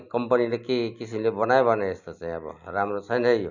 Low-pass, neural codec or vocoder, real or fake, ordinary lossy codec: 7.2 kHz; none; real; none